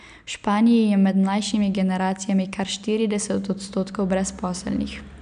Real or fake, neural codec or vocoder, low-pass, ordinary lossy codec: real; none; 9.9 kHz; none